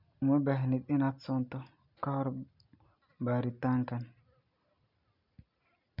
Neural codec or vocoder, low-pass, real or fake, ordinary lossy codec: none; 5.4 kHz; real; none